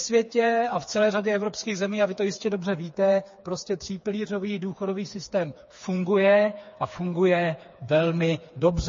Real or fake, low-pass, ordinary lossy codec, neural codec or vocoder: fake; 7.2 kHz; MP3, 32 kbps; codec, 16 kHz, 4 kbps, FreqCodec, smaller model